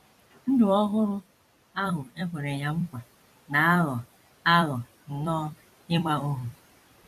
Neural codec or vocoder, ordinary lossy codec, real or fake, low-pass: vocoder, 44.1 kHz, 128 mel bands every 256 samples, BigVGAN v2; none; fake; 14.4 kHz